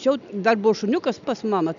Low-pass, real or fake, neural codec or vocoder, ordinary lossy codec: 7.2 kHz; real; none; MP3, 96 kbps